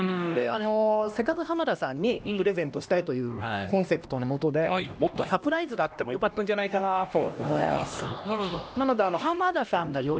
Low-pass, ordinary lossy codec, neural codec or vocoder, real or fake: none; none; codec, 16 kHz, 1 kbps, X-Codec, HuBERT features, trained on LibriSpeech; fake